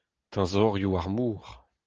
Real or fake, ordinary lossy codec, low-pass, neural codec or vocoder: real; Opus, 16 kbps; 7.2 kHz; none